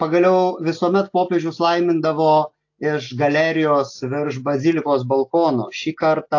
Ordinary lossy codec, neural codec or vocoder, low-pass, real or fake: AAC, 48 kbps; none; 7.2 kHz; real